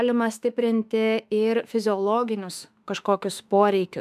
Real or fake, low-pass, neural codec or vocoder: fake; 14.4 kHz; autoencoder, 48 kHz, 32 numbers a frame, DAC-VAE, trained on Japanese speech